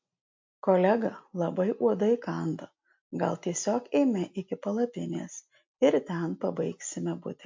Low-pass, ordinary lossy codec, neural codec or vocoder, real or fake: 7.2 kHz; MP3, 48 kbps; none; real